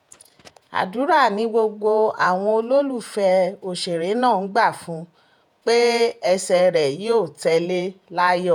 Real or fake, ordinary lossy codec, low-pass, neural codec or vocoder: fake; none; 19.8 kHz; vocoder, 48 kHz, 128 mel bands, Vocos